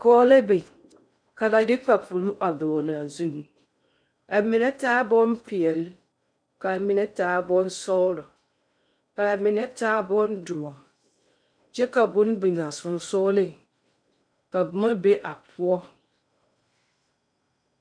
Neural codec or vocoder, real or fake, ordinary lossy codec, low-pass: codec, 16 kHz in and 24 kHz out, 0.6 kbps, FocalCodec, streaming, 4096 codes; fake; MP3, 96 kbps; 9.9 kHz